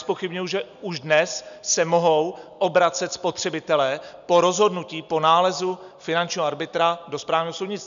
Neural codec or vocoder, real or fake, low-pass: none; real; 7.2 kHz